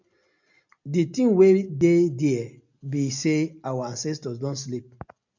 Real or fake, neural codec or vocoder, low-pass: real; none; 7.2 kHz